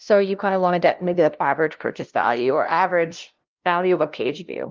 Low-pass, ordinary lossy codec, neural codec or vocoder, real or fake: 7.2 kHz; Opus, 32 kbps; codec, 16 kHz, 0.5 kbps, FunCodec, trained on LibriTTS, 25 frames a second; fake